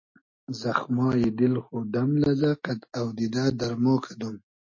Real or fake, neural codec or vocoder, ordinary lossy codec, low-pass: real; none; MP3, 32 kbps; 7.2 kHz